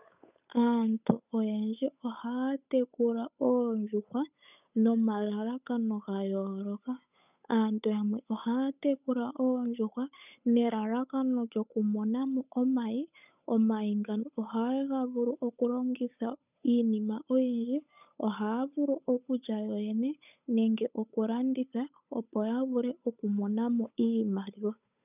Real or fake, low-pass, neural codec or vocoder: fake; 3.6 kHz; codec, 16 kHz, 8 kbps, FunCodec, trained on Chinese and English, 25 frames a second